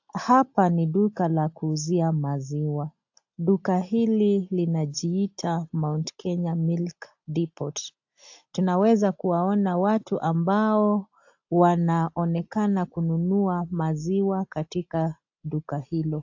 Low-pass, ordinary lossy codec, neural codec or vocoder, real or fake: 7.2 kHz; AAC, 48 kbps; none; real